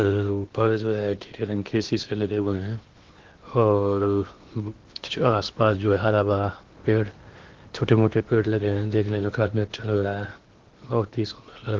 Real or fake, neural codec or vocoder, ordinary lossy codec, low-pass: fake; codec, 16 kHz in and 24 kHz out, 0.8 kbps, FocalCodec, streaming, 65536 codes; Opus, 16 kbps; 7.2 kHz